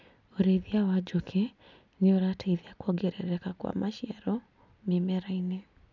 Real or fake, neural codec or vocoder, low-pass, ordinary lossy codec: real; none; 7.2 kHz; none